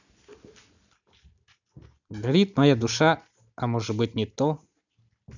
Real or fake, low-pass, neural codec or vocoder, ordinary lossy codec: real; 7.2 kHz; none; none